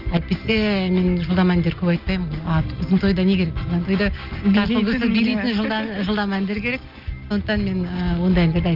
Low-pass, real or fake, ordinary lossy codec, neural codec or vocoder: 5.4 kHz; real; Opus, 24 kbps; none